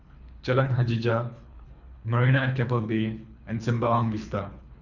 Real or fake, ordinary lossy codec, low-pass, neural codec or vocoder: fake; none; 7.2 kHz; codec, 24 kHz, 3 kbps, HILCodec